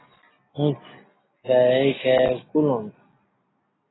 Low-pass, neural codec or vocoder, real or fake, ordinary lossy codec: 7.2 kHz; none; real; AAC, 16 kbps